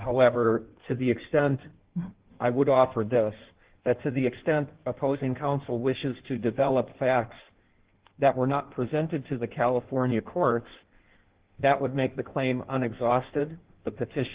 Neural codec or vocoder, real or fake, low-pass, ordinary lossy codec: codec, 16 kHz in and 24 kHz out, 1.1 kbps, FireRedTTS-2 codec; fake; 3.6 kHz; Opus, 16 kbps